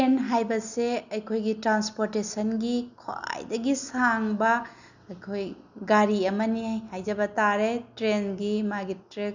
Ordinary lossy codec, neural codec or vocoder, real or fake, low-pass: none; none; real; 7.2 kHz